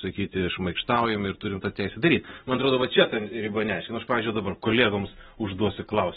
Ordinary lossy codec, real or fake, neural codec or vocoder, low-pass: AAC, 16 kbps; real; none; 14.4 kHz